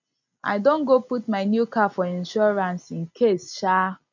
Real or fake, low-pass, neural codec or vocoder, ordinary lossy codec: real; 7.2 kHz; none; none